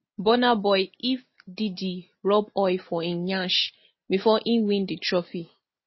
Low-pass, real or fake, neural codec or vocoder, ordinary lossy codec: 7.2 kHz; real; none; MP3, 24 kbps